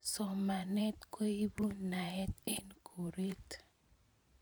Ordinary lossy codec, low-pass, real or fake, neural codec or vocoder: none; none; fake; vocoder, 44.1 kHz, 128 mel bands every 512 samples, BigVGAN v2